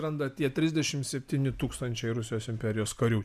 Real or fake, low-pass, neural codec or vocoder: real; 14.4 kHz; none